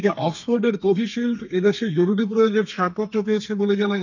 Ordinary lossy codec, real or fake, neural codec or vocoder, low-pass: none; fake; codec, 32 kHz, 1.9 kbps, SNAC; 7.2 kHz